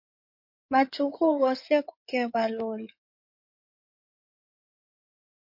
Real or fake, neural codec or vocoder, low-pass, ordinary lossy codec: fake; codec, 16 kHz in and 24 kHz out, 2.2 kbps, FireRedTTS-2 codec; 5.4 kHz; MP3, 32 kbps